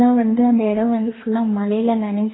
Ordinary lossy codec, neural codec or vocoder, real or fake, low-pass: AAC, 16 kbps; codec, 44.1 kHz, 2.6 kbps, DAC; fake; 7.2 kHz